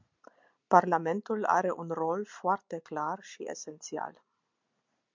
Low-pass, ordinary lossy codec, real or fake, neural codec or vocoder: 7.2 kHz; MP3, 64 kbps; real; none